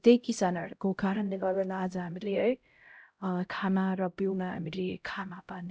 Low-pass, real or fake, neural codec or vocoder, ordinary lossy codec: none; fake; codec, 16 kHz, 0.5 kbps, X-Codec, HuBERT features, trained on LibriSpeech; none